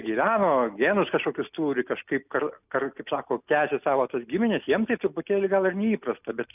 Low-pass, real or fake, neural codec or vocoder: 3.6 kHz; real; none